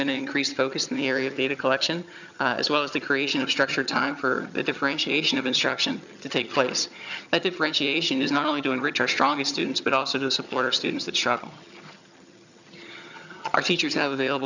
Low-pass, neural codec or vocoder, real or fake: 7.2 kHz; vocoder, 22.05 kHz, 80 mel bands, HiFi-GAN; fake